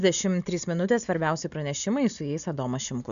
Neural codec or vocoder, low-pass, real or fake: none; 7.2 kHz; real